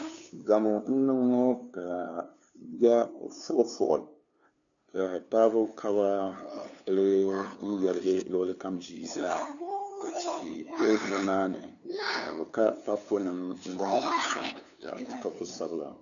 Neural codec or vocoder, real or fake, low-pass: codec, 16 kHz, 2 kbps, FunCodec, trained on LibriTTS, 25 frames a second; fake; 7.2 kHz